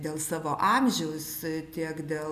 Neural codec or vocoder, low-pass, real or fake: none; 14.4 kHz; real